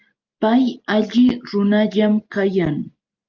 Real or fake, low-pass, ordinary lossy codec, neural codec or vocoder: real; 7.2 kHz; Opus, 24 kbps; none